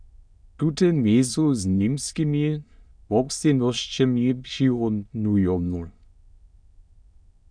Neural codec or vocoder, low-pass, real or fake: autoencoder, 22.05 kHz, a latent of 192 numbers a frame, VITS, trained on many speakers; 9.9 kHz; fake